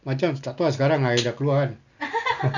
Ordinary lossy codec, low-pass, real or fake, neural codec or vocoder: none; 7.2 kHz; real; none